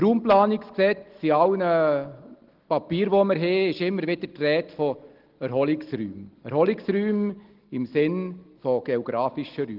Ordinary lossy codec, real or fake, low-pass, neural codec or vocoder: Opus, 16 kbps; real; 5.4 kHz; none